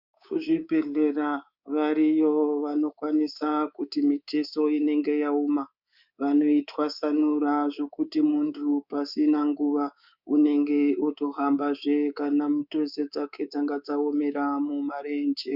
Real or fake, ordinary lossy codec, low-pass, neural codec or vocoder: fake; Opus, 64 kbps; 5.4 kHz; codec, 24 kHz, 3.1 kbps, DualCodec